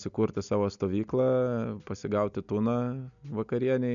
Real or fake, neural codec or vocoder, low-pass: real; none; 7.2 kHz